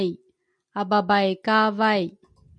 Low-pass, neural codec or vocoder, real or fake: 9.9 kHz; none; real